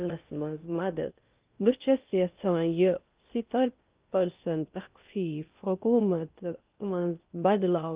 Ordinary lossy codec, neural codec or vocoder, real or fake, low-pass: Opus, 24 kbps; codec, 16 kHz in and 24 kHz out, 0.6 kbps, FocalCodec, streaming, 4096 codes; fake; 3.6 kHz